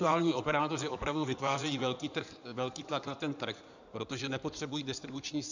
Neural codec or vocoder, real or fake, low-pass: codec, 16 kHz in and 24 kHz out, 2.2 kbps, FireRedTTS-2 codec; fake; 7.2 kHz